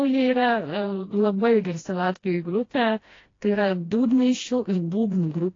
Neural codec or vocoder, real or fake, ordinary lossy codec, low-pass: codec, 16 kHz, 1 kbps, FreqCodec, smaller model; fake; AAC, 32 kbps; 7.2 kHz